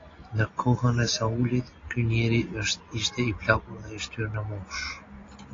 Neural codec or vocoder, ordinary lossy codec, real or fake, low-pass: none; AAC, 32 kbps; real; 7.2 kHz